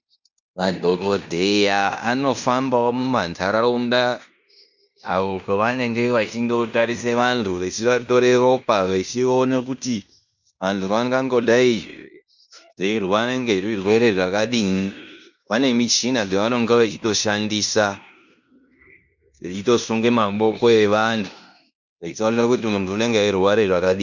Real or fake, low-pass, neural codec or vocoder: fake; 7.2 kHz; codec, 16 kHz in and 24 kHz out, 0.9 kbps, LongCat-Audio-Codec, fine tuned four codebook decoder